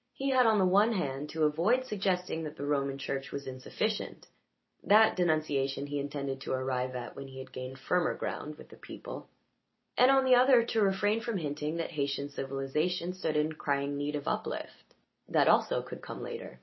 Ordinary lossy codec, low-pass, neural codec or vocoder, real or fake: MP3, 24 kbps; 7.2 kHz; none; real